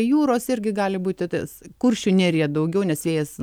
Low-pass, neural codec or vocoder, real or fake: 19.8 kHz; none; real